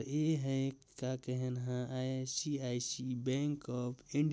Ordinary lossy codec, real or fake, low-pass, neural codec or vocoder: none; real; none; none